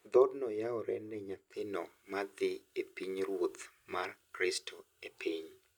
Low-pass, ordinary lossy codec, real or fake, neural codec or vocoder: none; none; real; none